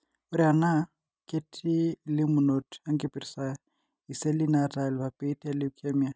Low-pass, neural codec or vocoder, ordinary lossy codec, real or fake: none; none; none; real